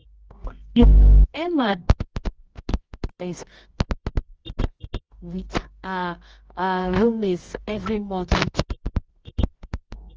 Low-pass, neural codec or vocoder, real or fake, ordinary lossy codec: 7.2 kHz; codec, 24 kHz, 0.9 kbps, WavTokenizer, medium music audio release; fake; Opus, 24 kbps